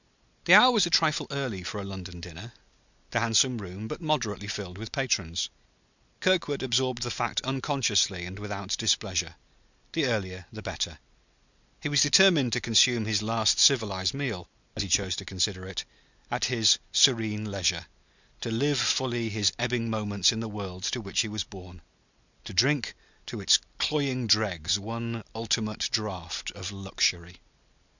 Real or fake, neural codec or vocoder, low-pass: real; none; 7.2 kHz